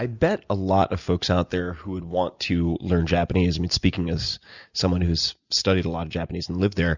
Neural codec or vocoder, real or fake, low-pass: none; real; 7.2 kHz